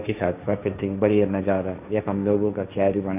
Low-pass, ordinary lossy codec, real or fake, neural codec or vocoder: 3.6 kHz; none; fake; codec, 16 kHz, 1.1 kbps, Voila-Tokenizer